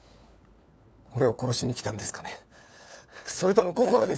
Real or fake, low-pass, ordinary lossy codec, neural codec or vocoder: fake; none; none; codec, 16 kHz, 4 kbps, FunCodec, trained on LibriTTS, 50 frames a second